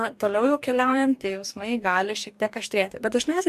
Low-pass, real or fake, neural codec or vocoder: 14.4 kHz; fake; codec, 44.1 kHz, 2.6 kbps, DAC